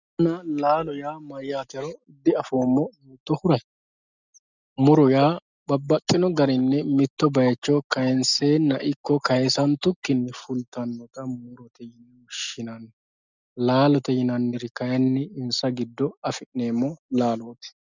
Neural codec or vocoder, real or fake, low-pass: none; real; 7.2 kHz